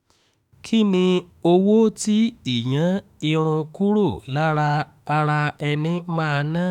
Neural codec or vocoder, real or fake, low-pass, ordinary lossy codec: autoencoder, 48 kHz, 32 numbers a frame, DAC-VAE, trained on Japanese speech; fake; 19.8 kHz; none